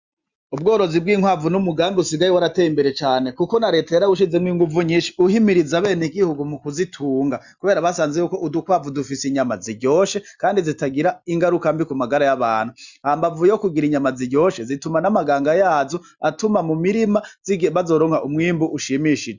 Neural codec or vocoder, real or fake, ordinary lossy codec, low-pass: none; real; Opus, 64 kbps; 7.2 kHz